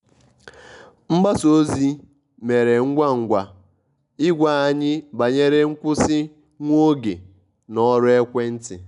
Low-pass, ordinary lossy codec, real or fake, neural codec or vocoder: 10.8 kHz; none; real; none